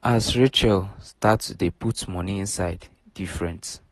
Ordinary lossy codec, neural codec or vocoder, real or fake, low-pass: AAC, 32 kbps; none; real; 19.8 kHz